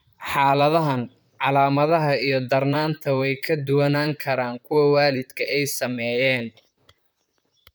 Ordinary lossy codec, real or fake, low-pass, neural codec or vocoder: none; fake; none; vocoder, 44.1 kHz, 128 mel bands, Pupu-Vocoder